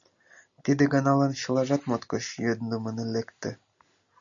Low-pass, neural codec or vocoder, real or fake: 7.2 kHz; none; real